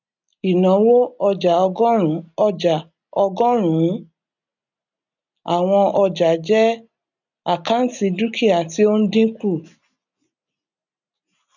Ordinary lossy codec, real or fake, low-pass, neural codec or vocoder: none; real; 7.2 kHz; none